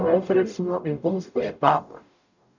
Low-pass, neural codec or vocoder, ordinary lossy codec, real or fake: 7.2 kHz; codec, 44.1 kHz, 0.9 kbps, DAC; none; fake